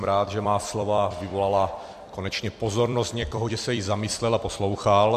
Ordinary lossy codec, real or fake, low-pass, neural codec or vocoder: MP3, 64 kbps; fake; 14.4 kHz; vocoder, 44.1 kHz, 128 mel bands every 256 samples, BigVGAN v2